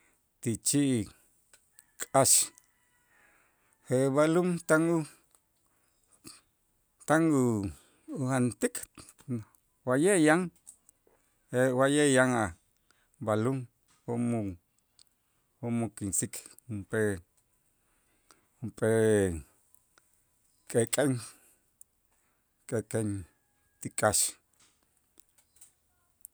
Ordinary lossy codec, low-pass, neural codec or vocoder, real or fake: none; none; none; real